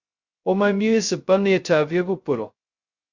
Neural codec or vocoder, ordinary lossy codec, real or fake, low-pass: codec, 16 kHz, 0.2 kbps, FocalCodec; Opus, 64 kbps; fake; 7.2 kHz